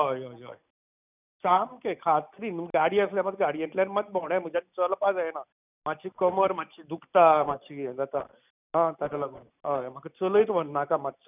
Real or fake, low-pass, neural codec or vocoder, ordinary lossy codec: real; 3.6 kHz; none; none